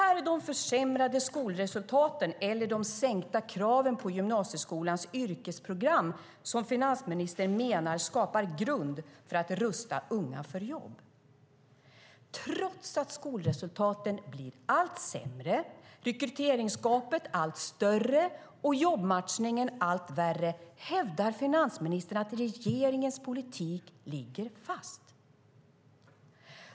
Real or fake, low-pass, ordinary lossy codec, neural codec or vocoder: real; none; none; none